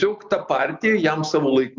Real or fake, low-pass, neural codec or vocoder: real; 7.2 kHz; none